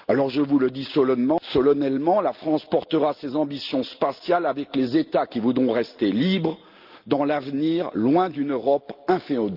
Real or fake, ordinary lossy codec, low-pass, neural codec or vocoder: real; Opus, 24 kbps; 5.4 kHz; none